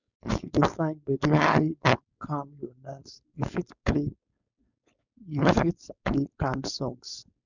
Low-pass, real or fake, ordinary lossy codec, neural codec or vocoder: 7.2 kHz; fake; none; codec, 16 kHz, 4.8 kbps, FACodec